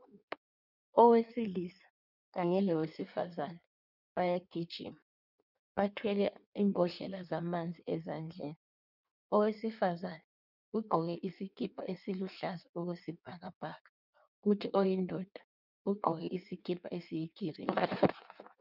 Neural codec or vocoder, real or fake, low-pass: codec, 16 kHz in and 24 kHz out, 1.1 kbps, FireRedTTS-2 codec; fake; 5.4 kHz